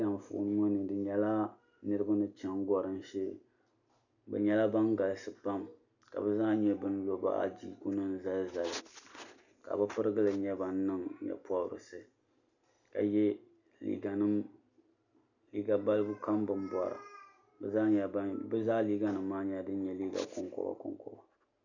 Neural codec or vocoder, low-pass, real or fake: none; 7.2 kHz; real